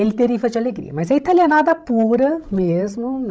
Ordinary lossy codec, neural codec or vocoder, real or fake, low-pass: none; codec, 16 kHz, 16 kbps, FreqCodec, larger model; fake; none